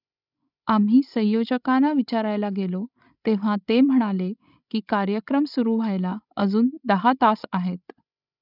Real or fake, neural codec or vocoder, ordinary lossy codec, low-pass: fake; codec, 16 kHz, 16 kbps, FreqCodec, larger model; none; 5.4 kHz